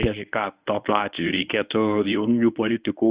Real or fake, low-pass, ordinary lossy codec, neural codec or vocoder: fake; 3.6 kHz; Opus, 64 kbps; codec, 24 kHz, 0.9 kbps, WavTokenizer, medium speech release version 1